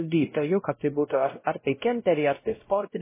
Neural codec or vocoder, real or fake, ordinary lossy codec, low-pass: codec, 16 kHz, 0.5 kbps, X-Codec, WavLM features, trained on Multilingual LibriSpeech; fake; MP3, 16 kbps; 3.6 kHz